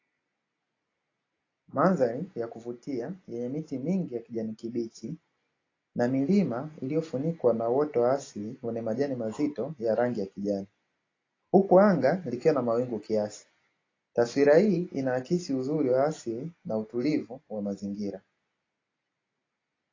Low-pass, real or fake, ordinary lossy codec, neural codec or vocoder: 7.2 kHz; real; AAC, 32 kbps; none